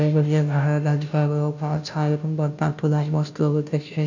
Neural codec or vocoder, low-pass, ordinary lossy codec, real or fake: codec, 16 kHz, 0.5 kbps, FunCodec, trained on Chinese and English, 25 frames a second; 7.2 kHz; none; fake